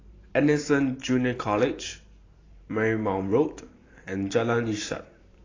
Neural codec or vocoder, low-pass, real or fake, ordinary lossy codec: vocoder, 44.1 kHz, 128 mel bands every 512 samples, BigVGAN v2; 7.2 kHz; fake; AAC, 32 kbps